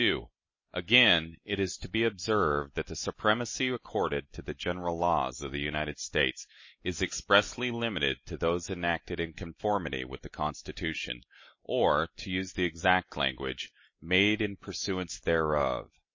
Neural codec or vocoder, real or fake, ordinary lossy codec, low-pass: none; real; MP3, 32 kbps; 7.2 kHz